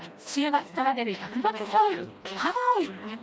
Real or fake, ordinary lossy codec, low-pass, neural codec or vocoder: fake; none; none; codec, 16 kHz, 1 kbps, FreqCodec, smaller model